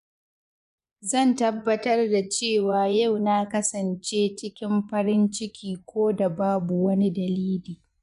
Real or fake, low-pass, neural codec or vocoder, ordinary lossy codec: fake; 10.8 kHz; vocoder, 24 kHz, 100 mel bands, Vocos; none